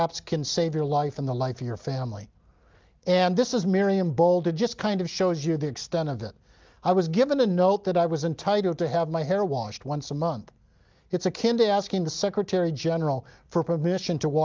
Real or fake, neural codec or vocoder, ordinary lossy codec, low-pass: real; none; Opus, 32 kbps; 7.2 kHz